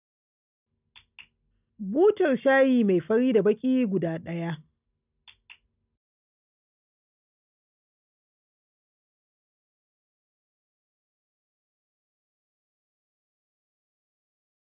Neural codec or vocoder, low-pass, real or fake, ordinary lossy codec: none; 3.6 kHz; real; none